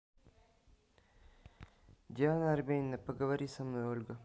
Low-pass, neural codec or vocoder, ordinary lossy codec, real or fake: none; none; none; real